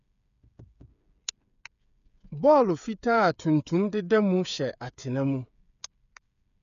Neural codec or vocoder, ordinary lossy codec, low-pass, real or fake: codec, 16 kHz, 8 kbps, FreqCodec, smaller model; none; 7.2 kHz; fake